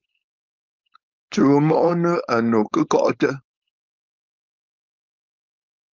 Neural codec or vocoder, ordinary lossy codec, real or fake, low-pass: codec, 16 kHz, 4.8 kbps, FACodec; Opus, 24 kbps; fake; 7.2 kHz